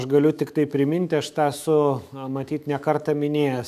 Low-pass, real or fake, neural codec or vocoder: 14.4 kHz; fake; autoencoder, 48 kHz, 128 numbers a frame, DAC-VAE, trained on Japanese speech